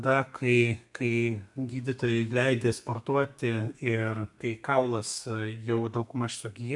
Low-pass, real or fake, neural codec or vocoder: 10.8 kHz; fake; codec, 32 kHz, 1.9 kbps, SNAC